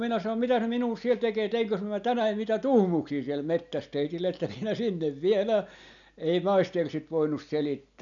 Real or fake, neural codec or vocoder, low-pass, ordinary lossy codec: real; none; 7.2 kHz; none